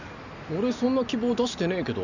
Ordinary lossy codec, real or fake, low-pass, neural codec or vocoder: none; real; 7.2 kHz; none